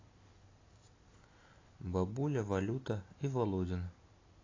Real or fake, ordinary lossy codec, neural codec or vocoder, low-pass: real; AAC, 32 kbps; none; 7.2 kHz